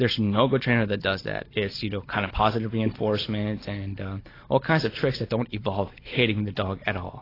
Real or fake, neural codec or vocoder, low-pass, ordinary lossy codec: real; none; 5.4 kHz; AAC, 24 kbps